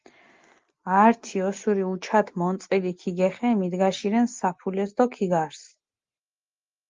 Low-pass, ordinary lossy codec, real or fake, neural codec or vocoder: 7.2 kHz; Opus, 32 kbps; real; none